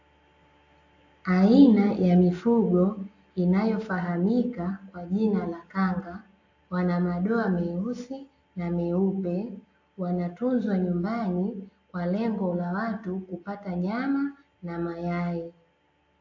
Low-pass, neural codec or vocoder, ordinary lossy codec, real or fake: 7.2 kHz; none; AAC, 48 kbps; real